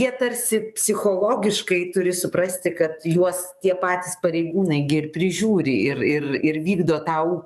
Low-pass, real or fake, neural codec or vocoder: 14.4 kHz; fake; codec, 44.1 kHz, 7.8 kbps, DAC